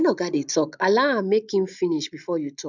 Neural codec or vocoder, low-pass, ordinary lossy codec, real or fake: none; 7.2 kHz; none; real